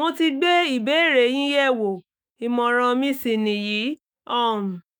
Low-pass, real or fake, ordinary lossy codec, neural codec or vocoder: none; fake; none; autoencoder, 48 kHz, 128 numbers a frame, DAC-VAE, trained on Japanese speech